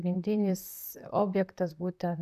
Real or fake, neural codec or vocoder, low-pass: fake; vocoder, 44.1 kHz, 128 mel bands, Pupu-Vocoder; 14.4 kHz